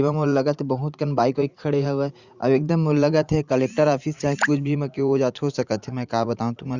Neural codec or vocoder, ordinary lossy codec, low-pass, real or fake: vocoder, 44.1 kHz, 80 mel bands, Vocos; Opus, 64 kbps; 7.2 kHz; fake